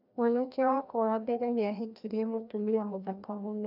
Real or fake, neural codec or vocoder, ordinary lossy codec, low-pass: fake; codec, 16 kHz, 1 kbps, FreqCodec, larger model; none; 5.4 kHz